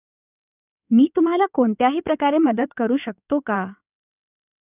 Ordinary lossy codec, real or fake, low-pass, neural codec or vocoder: none; fake; 3.6 kHz; codec, 16 kHz in and 24 kHz out, 2.2 kbps, FireRedTTS-2 codec